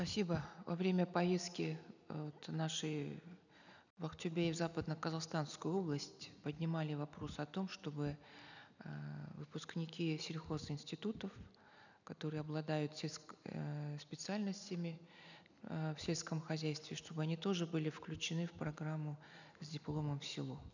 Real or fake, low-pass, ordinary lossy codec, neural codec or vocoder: real; 7.2 kHz; none; none